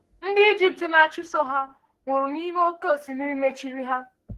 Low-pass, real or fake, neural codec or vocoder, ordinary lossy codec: 14.4 kHz; fake; codec, 44.1 kHz, 2.6 kbps, SNAC; Opus, 16 kbps